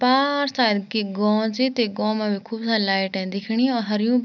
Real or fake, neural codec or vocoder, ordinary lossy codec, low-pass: real; none; none; 7.2 kHz